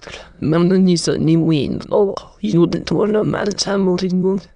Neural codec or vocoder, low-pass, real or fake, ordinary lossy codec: autoencoder, 22.05 kHz, a latent of 192 numbers a frame, VITS, trained on many speakers; 9.9 kHz; fake; none